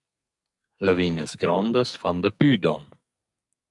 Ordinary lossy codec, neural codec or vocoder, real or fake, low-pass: MP3, 64 kbps; codec, 44.1 kHz, 2.6 kbps, SNAC; fake; 10.8 kHz